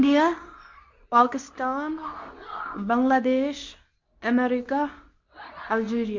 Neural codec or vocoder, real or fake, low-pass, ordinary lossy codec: codec, 24 kHz, 0.9 kbps, WavTokenizer, medium speech release version 2; fake; 7.2 kHz; MP3, 48 kbps